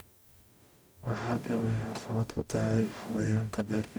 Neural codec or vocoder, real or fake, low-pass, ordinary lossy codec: codec, 44.1 kHz, 0.9 kbps, DAC; fake; none; none